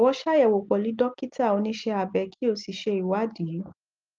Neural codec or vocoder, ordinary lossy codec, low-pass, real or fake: none; Opus, 24 kbps; 7.2 kHz; real